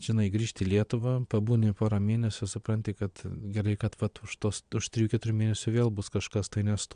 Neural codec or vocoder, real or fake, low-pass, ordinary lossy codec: vocoder, 22.05 kHz, 80 mel bands, Vocos; fake; 9.9 kHz; AAC, 96 kbps